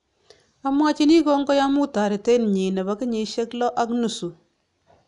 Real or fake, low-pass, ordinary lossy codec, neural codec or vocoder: real; 10.8 kHz; none; none